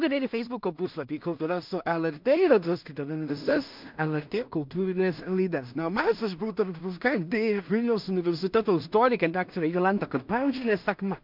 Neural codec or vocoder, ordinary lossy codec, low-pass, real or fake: codec, 16 kHz in and 24 kHz out, 0.4 kbps, LongCat-Audio-Codec, two codebook decoder; MP3, 48 kbps; 5.4 kHz; fake